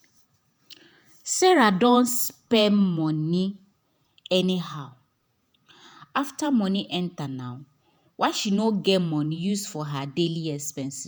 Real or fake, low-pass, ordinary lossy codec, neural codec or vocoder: fake; none; none; vocoder, 48 kHz, 128 mel bands, Vocos